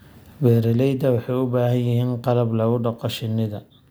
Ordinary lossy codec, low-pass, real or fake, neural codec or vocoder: none; none; real; none